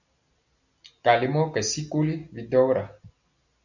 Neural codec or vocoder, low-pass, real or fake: none; 7.2 kHz; real